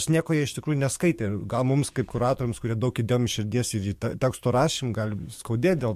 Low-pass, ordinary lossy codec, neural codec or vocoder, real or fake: 14.4 kHz; MP3, 64 kbps; codec, 44.1 kHz, 7.8 kbps, DAC; fake